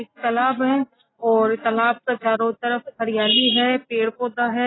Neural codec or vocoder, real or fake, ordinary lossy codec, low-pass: none; real; AAC, 16 kbps; 7.2 kHz